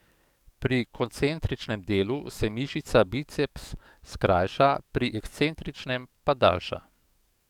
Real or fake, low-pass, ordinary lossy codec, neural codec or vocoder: fake; 19.8 kHz; none; codec, 44.1 kHz, 7.8 kbps, DAC